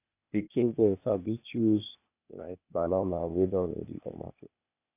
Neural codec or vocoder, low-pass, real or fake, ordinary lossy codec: codec, 16 kHz, 0.8 kbps, ZipCodec; 3.6 kHz; fake; none